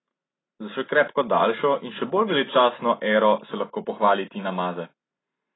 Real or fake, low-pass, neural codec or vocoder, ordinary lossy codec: real; 7.2 kHz; none; AAC, 16 kbps